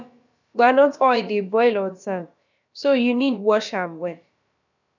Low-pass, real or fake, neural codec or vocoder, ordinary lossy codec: 7.2 kHz; fake; codec, 16 kHz, about 1 kbps, DyCAST, with the encoder's durations; none